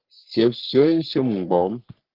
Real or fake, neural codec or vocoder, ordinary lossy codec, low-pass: fake; codec, 44.1 kHz, 3.4 kbps, Pupu-Codec; Opus, 16 kbps; 5.4 kHz